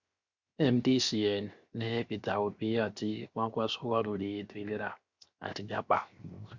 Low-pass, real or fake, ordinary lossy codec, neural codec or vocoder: 7.2 kHz; fake; Opus, 64 kbps; codec, 16 kHz, 0.7 kbps, FocalCodec